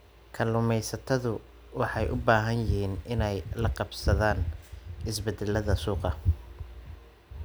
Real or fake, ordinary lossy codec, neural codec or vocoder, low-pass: real; none; none; none